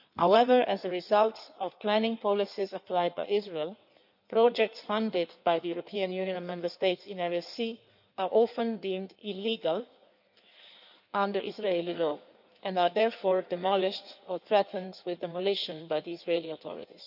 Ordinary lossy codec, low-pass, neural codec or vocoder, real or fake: none; 5.4 kHz; codec, 16 kHz in and 24 kHz out, 1.1 kbps, FireRedTTS-2 codec; fake